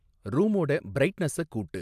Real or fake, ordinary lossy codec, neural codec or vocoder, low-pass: real; AAC, 96 kbps; none; 14.4 kHz